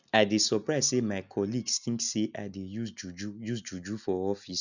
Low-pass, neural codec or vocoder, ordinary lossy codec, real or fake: 7.2 kHz; none; none; real